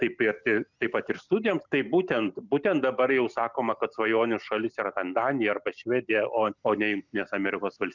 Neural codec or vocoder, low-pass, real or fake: none; 7.2 kHz; real